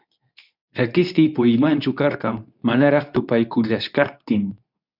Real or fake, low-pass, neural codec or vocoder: fake; 5.4 kHz; codec, 24 kHz, 0.9 kbps, WavTokenizer, medium speech release version 2